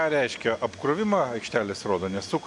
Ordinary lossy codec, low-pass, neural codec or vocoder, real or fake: AAC, 64 kbps; 10.8 kHz; none; real